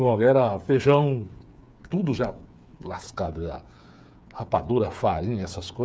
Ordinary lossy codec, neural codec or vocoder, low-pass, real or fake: none; codec, 16 kHz, 8 kbps, FreqCodec, smaller model; none; fake